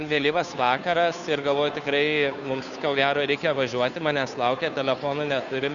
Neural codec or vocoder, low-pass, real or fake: codec, 16 kHz, 2 kbps, FunCodec, trained on Chinese and English, 25 frames a second; 7.2 kHz; fake